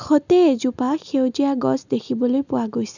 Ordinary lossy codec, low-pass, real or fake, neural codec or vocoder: none; 7.2 kHz; real; none